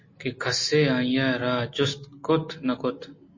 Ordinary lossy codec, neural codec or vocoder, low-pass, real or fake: MP3, 32 kbps; none; 7.2 kHz; real